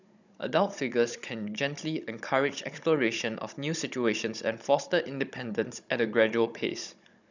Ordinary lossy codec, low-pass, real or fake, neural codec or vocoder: none; 7.2 kHz; fake; codec, 16 kHz, 16 kbps, FunCodec, trained on Chinese and English, 50 frames a second